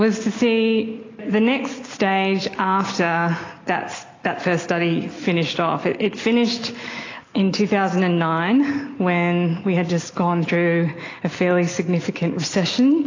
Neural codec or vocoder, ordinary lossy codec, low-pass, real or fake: none; AAC, 32 kbps; 7.2 kHz; real